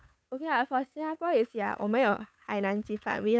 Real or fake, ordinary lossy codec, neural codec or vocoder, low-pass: fake; none; codec, 16 kHz, 8 kbps, FunCodec, trained on Chinese and English, 25 frames a second; none